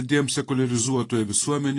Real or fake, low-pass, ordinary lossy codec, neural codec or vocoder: fake; 10.8 kHz; AAC, 32 kbps; vocoder, 44.1 kHz, 128 mel bands every 512 samples, BigVGAN v2